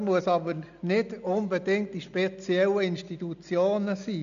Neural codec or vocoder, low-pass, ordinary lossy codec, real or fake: none; 7.2 kHz; MP3, 96 kbps; real